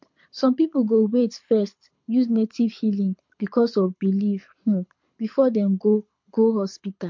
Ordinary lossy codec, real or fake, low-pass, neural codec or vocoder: MP3, 48 kbps; fake; 7.2 kHz; codec, 24 kHz, 6 kbps, HILCodec